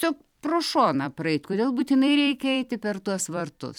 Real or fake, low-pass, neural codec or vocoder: fake; 19.8 kHz; vocoder, 44.1 kHz, 128 mel bands every 256 samples, BigVGAN v2